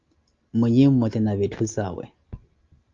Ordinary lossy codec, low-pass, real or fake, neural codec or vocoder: Opus, 32 kbps; 7.2 kHz; real; none